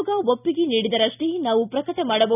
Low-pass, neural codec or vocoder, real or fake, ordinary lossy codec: 3.6 kHz; none; real; none